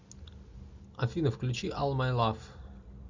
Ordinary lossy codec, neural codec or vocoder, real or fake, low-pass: MP3, 64 kbps; none; real; 7.2 kHz